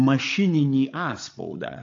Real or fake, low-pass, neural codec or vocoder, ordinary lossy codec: fake; 7.2 kHz; codec, 16 kHz, 8 kbps, FreqCodec, larger model; AAC, 48 kbps